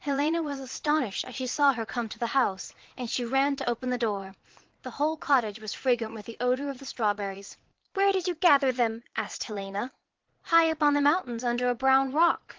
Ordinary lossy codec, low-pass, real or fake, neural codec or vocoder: Opus, 16 kbps; 7.2 kHz; fake; vocoder, 22.05 kHz, 80 mel bands, Vocos